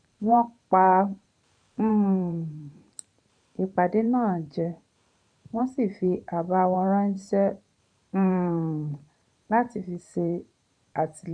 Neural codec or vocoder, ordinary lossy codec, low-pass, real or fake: vocoder, 44.1 kHz, 128 mel bands every 512 samples, BigVGAN v2; none; 9.9 kHz; fake